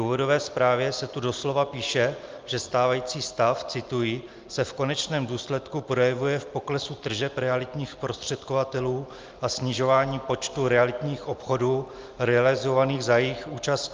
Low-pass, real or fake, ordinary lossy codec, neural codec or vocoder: 7.2 kHz; real; Opus, 24 kbps; none